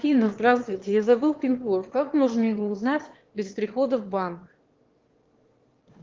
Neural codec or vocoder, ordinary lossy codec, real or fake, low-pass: autoencoder, 22.05 kHz, a latent of 192 numbers a frame, VITS, trained on one speaker; Opus, 16 kbps; fake; 7.2 kHz